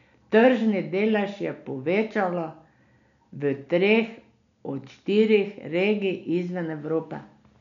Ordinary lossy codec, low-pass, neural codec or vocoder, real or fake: none; 7.2 kHz; none; real